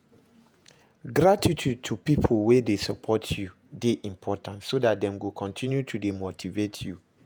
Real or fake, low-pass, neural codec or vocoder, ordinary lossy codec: fake; none; vocoder, 48 kHz, 128 mel bands, Vocos; none